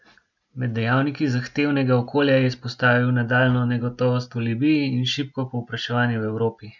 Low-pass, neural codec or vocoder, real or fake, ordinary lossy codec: 7.2 kHz; none; real; none